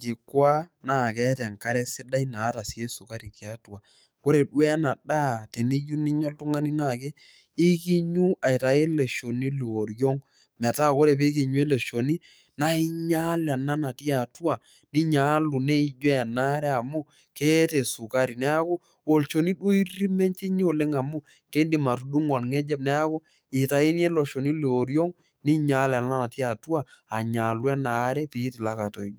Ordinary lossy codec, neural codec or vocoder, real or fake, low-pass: none; codec, 44.1 kHz, 7.8 kbps, DAC; fake; none